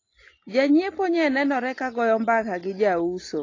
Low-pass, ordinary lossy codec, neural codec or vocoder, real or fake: 7.2 kHz; AAC, 32 kbps; none; real